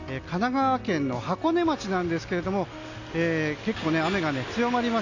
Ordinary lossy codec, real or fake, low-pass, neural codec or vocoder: AAC, 48 kbps; real; 7.2 kHz; none